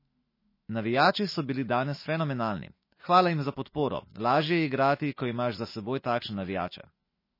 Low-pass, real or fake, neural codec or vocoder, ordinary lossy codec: 5.4 kHz; fake; autoencoder, 48 kHz, 128 numbers a frame, DAC-VAE, trained on Japanese speech; MP3, 24 kbps